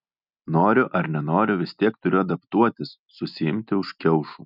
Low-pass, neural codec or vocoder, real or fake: 5.4 kHz; none; real